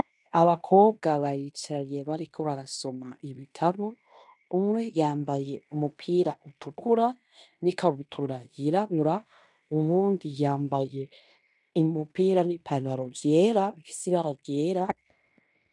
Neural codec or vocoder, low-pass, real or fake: codec, 16 kHz in and 24 kHz out, 0.9 kbps, LongCat-Audio-Codec, fine tuned four codebook decoder; 10.8 kHz; fake